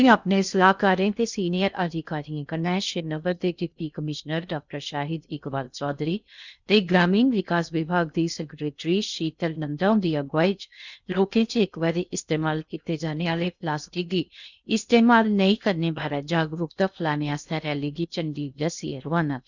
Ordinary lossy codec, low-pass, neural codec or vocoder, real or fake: none; 7.2 kHz; codec, 16 kHz in and 24 kHz out, 0.6 kbps, FocalCodec, streaming, 2048 codes; fake